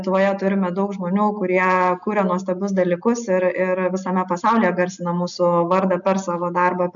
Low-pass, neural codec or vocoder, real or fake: 7.2 kHz; none; real